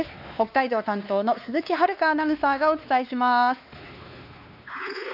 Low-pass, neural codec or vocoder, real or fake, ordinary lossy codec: 5.4 kHz; codec, 16 kHz, 2 kbps, X-Codec, WavLM features, trained on Multilingual LibriSpeech; fake; none